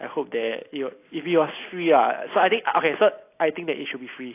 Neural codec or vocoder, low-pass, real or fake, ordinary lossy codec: none; 3.6 kHz; real; AAC, 24 kbps